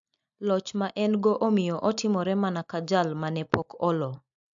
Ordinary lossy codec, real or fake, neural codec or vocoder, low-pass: AAC, 64 kbps; real; none; 7.2 kHz